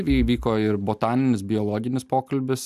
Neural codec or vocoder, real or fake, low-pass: none; real; 14.4 kHz